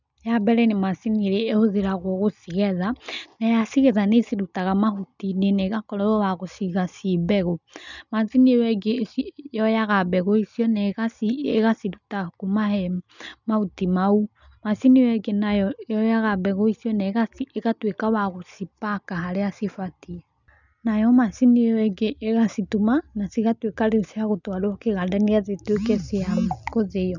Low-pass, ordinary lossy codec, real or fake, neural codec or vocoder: 7.2 kHz; none; real; none